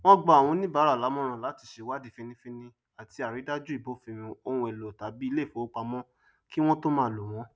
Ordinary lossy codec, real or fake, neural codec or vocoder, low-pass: none; real; none; none